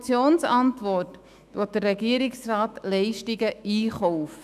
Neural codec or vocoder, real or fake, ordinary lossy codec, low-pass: autoencoder, 48 kHz, 128 numbers a frame, DAC-VAE, trained on Japanese speech; fake; none; 14.4 kHz